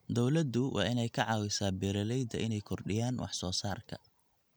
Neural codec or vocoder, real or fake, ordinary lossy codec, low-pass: none; real; none; none